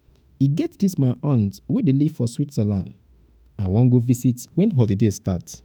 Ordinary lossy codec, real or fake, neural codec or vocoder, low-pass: none; fake; autoencoder, 48 kHz, 32 numbers a frame, DAC-VAE, trained on Japanese speech; none